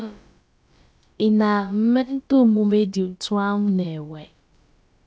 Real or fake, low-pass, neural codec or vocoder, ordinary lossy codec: fake; none; codec, 16 kHz, about 1 kbps, DyCAST, with the encoder's durations; none